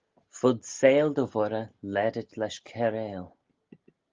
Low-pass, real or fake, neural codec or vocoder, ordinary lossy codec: 7.2 kHz; real; none; Opus, 32 kbps